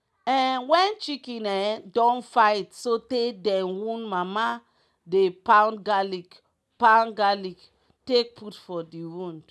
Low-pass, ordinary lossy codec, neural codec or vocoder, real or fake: none; none; none; real